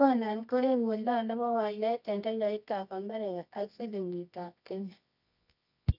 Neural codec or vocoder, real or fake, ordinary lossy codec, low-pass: codec, 24 kHz, 0.9 kbps, WavTokenizer, medium music audio release; fake; none; 5.4 kHz